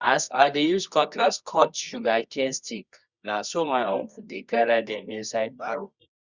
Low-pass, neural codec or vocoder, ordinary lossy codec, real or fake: 7.2 kHz; codec, 24 kHz, 0.9 kbps, WavTokenizer, medium music audio release; Opus, 64 kbps; fake